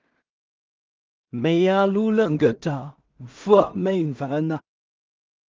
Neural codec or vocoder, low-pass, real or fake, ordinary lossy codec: codec, 16 kHz in and 24 kHz out, 0.4 kbps, LongCat-Audio-Codec, two codebook decoder; 7.2 kHz; fake; Opus, 32 kbps